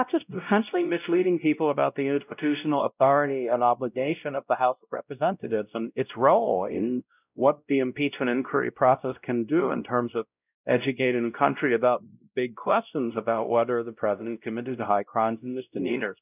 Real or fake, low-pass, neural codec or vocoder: fake; 3.6 kHz; codec, 16 kHz, 0.5 kbps, X-Codec, WavLM features, trained on Multilingual LibriSpeech